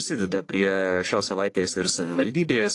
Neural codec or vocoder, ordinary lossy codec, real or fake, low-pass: codec, 44.1 kHz, 1.7 kbps, Pupu-Codec; AAC, 48 kbps; fake; 10.8 kHz